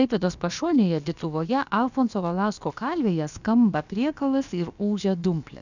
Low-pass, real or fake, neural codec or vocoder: 7.2 kHz; fake; codec, 16 kHz, about 1 kbps, DyCAST, with the encoder's durations